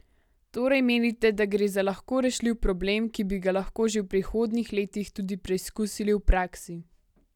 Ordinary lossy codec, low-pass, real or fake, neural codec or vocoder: none; 19.8 kHz; real; none